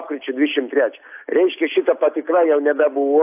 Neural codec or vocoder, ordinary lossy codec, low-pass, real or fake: none; AAC, 32 kbps; 3.6 kHz; real